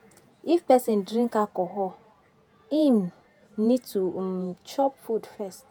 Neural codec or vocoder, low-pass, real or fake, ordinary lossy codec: vocoder, 48 kHz, 128 mel bands, Vocos; none; fake; none